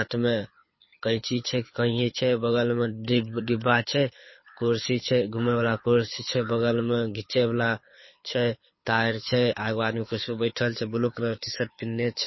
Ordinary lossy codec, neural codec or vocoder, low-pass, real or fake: MP3, 24 kbps; codec, 24 kHz, 6 kbps, HILCodec; 7.2 kHz; fake